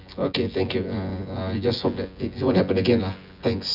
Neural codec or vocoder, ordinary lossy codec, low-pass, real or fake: vocoder, 24 kHz, 100 mel bands, Vocos; none; 5.4 kHz; fake